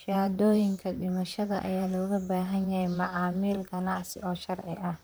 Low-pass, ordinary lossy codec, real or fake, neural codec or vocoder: none; none; fake; vocoder, 44.1 kHz, 128 mel bands, Pupu-Vocoder